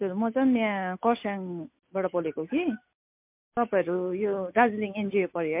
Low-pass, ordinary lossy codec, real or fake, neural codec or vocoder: 3.6 kHz; MP3, 32 kbps; real; none